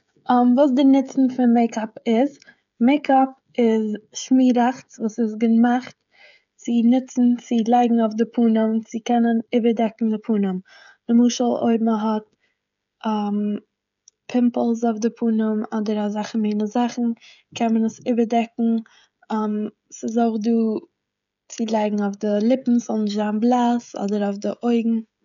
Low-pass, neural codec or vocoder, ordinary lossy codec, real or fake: 7.2 kHz; codec, 16 kHz, 16 kbps, FreqCodec, smaller model; none; fake